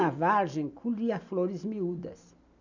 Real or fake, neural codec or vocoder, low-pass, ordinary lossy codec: real; none; 7.2 kHz; none